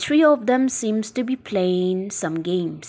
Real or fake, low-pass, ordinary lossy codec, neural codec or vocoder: real; none; none; none